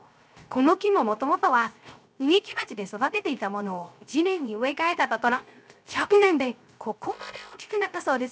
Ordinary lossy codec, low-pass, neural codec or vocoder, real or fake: none; none; codec, 16 kHz, 0.3 kbps, FocalCodec; fake